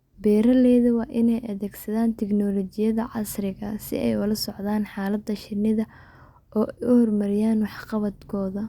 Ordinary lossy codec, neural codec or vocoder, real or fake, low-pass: Opus, 64 kbps; none; real; 19.8 kHz